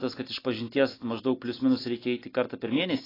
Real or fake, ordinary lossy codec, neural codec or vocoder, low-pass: real; AAC, 24 kbps; none; 5.4 kHz